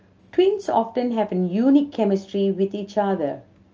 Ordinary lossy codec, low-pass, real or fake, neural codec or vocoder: Opus, 24 kbps; 7.2 kHz; real; none